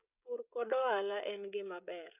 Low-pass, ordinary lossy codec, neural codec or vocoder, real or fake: 3.6 kHz; none; codec, 16 kHz, 16 kbps, FreqCodec, smaller model; fake